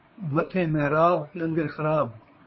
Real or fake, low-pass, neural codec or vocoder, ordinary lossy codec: fake; 7.2 kHz; codec, 24 kHz, 1 kbps, SNAC; MP3, 24 kbps